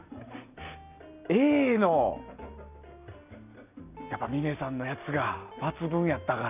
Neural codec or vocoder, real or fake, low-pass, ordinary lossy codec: none; real; 3.6 kHz; none